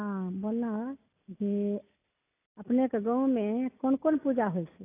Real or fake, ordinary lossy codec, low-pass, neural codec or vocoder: real; AAC, 24 kbps; 3.6 kHz; none